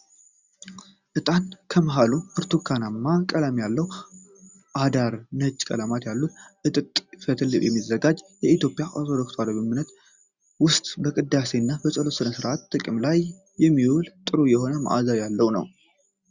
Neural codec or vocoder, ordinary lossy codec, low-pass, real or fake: none; Opus, 64 kbps; 7.2 kHz; real